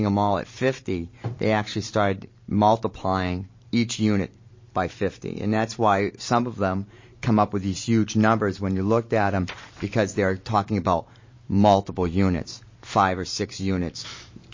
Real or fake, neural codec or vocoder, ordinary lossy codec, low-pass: fake; vocoder, 44.1 kHz, 128 mel bands every 512 samples, BigVGAN v2; MP3, 32 kbps; 7.2 kHz